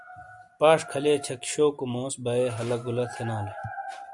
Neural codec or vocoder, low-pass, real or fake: none; 10.8 kHz; real